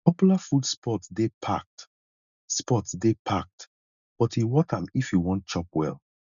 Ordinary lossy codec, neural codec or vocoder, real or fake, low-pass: none; none; real; 7.2 kHz